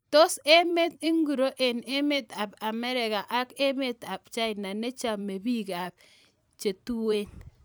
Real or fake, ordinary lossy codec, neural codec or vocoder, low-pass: fake; none; vocoder, 44.1 kHz, 128 mel bands every 512 samples, BigVGAN v2; none